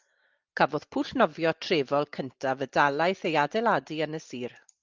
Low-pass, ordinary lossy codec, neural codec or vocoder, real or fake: 7.2 kHz; Opus, 24 kbps; none; real